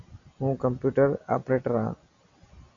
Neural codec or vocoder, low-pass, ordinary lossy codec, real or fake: none; 7.2 kHz; AAC, 64 kbps; real